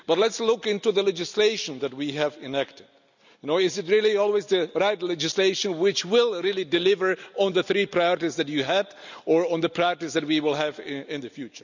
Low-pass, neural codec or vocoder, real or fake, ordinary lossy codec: 7.2 kHz; none; real; none